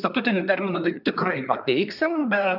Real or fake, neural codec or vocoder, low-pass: fake; codec, 24 kHz, 1 kbps, SNAC; 5.4 kHz